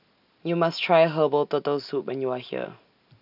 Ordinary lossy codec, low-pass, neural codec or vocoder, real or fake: none; 5.4 kHz; none; real